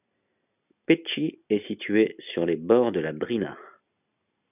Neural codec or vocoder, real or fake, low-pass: none; real; 3.6 kHz